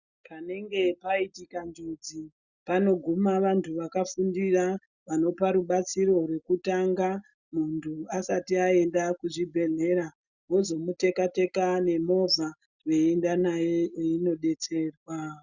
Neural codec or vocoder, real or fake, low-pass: none; real; 7.2 kHz